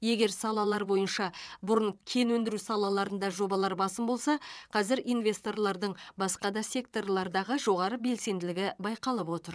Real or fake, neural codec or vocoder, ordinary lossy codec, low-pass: fake; vocoder, 22.05 kHz, 80 mel bands, WaveNeXt; none; none